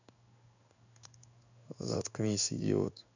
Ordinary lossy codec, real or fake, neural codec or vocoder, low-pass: none; fake; codec, 16 kHz in and 24 kHz out, 1 kbps, XY-Tokenizer; 7.2 kHz